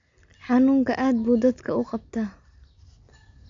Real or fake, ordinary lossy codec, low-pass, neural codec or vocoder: real; AAC, 48 kbps; 7.2 kHz; none